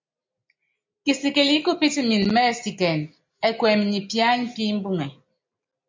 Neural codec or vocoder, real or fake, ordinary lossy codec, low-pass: none; real; MP3, 48 kbps; 7.2 kHz